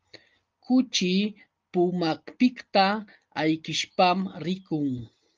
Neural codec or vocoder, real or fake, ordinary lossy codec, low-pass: none; real; Opus, 24 kbps; 7.2 kHz